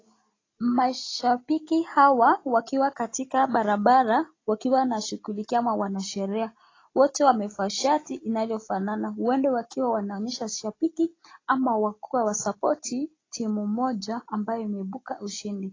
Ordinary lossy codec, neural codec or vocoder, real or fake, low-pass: AAC, 32 kbps; none; real; 7.2 kHz